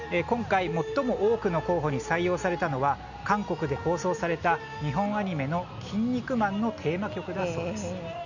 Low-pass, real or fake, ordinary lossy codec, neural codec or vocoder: 7.2 kHz; real; none; none